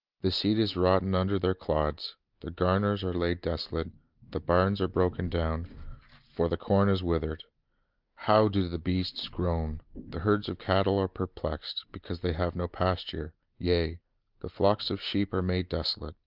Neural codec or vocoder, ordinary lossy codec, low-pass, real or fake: none; Opus, 32 kbps; 5.4 kHz; real